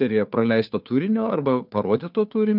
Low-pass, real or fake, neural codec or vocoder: 5.4 kHz; fake; codec, 16 kHz, 2 kbps, FunCodec, trained on Chinese and English, 25 frames a second